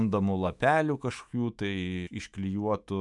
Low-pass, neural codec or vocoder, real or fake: 10.8 kHz; autoencoder, 48 kHz, 128 numbers a frame, DAC-VAE, trained on Japanese speech; fake